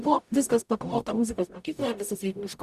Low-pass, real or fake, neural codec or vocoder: 14.4 kHz; fake; codec, 44.1 kHz, 0.9 kbps, DAC